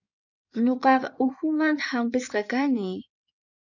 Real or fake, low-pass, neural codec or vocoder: fake; 7.2 kHz; codec, 16 kHz in and 24 kHz out, 2.2 kbps, FireRedTTS-2 codec